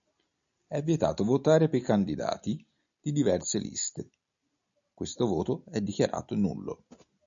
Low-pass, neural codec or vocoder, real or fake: 7.2 kHz; none; real